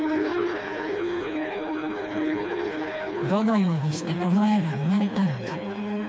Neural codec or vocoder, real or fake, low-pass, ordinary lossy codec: codec, 16 kHz, 2 kbps, FreqCodec, smaller model; fake; none; none